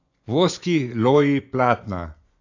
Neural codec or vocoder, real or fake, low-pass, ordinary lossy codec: vocoder, 24 kHz, 100 mel bands, Vocos; fake; 7.2 kHz; AAC, 48 kbps